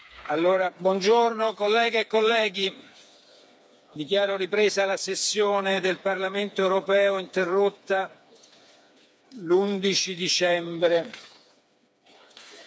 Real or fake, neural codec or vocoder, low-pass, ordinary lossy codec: fake; codec, 16 kHz, 4 kbps, FreqCodec, smaller model; none; none